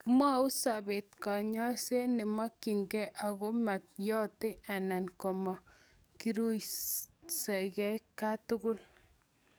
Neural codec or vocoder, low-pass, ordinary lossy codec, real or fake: codec, 44.1 kHz, 7.8 kbps, DAC; none; none; fake